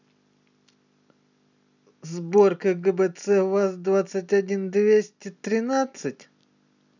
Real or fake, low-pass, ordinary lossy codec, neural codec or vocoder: real; 7.2 kHz; none; none